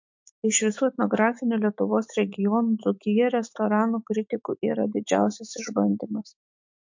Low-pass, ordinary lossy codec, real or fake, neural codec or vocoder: 7.2 kHz; MP3, 48 kbps; fake; autoencoder, 48 kHz, 128 numbers a frame, DAC-VAE, trained on Japanese speech